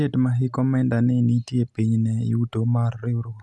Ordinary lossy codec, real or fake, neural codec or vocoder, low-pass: none; real; none; none